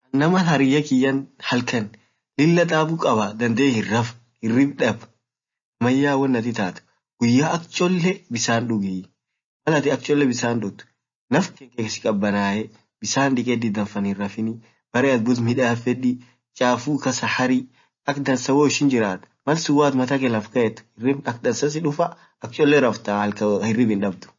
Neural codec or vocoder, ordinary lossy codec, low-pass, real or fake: none; MP3, 32 kbps; 7.2 kHz; real